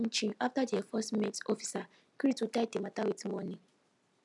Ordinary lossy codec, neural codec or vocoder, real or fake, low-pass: none; none; real; 10.8 kHz